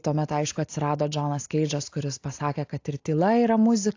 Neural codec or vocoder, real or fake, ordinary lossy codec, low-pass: none; real; AAC, 48 kbps; 7.2 kHz